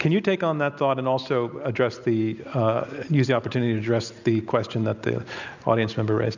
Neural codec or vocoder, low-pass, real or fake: none; 7.2 kHz; real